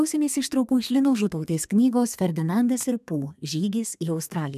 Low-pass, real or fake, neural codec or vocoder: 14.4 kHz; fake; codec, 32 kHz, 1.9 kbps, SNAC